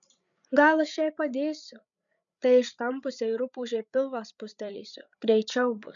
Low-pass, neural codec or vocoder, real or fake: 7.2 kHz; codec, 16 kHz, 8 kbps, FreqCodec, larger model; fake